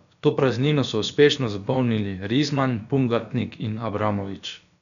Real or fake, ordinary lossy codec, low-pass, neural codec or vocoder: fake; none; 7.2 kHz; codec, 16 kHz, about 1 kbps, DyCAST, with the encoder's durations